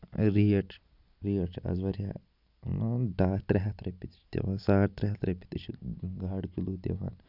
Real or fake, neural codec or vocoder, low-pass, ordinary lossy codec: real; none; 5.4 kHz; none